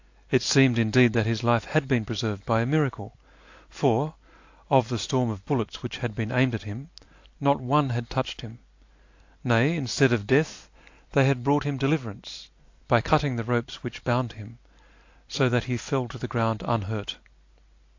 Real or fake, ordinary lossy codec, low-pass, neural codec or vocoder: real; AAC, 48 kbps; 7.2 kHz; none